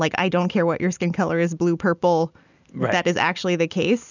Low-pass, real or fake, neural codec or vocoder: 7.2 kHz; real; none